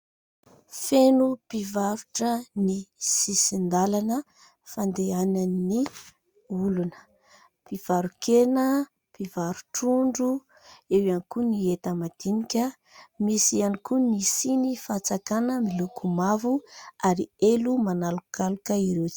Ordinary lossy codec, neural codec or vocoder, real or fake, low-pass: Opus, 64 kbps; none; real; 19.8 kHz